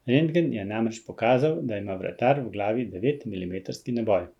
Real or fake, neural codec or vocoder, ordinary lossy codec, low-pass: real; none; none; 19.8 kHz